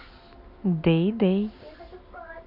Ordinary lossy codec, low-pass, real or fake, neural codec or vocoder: none; 5.4 kHz; real; none